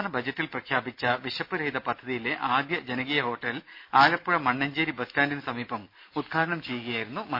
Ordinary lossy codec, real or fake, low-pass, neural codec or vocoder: none; real; 5.4 kHz; none